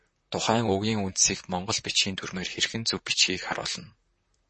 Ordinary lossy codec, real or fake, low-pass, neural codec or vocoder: MP3, 32 kbps; fake; 10.8 kHz; vocoder, 44.1 kHz, 128 mel bands, Pupu-Vocoder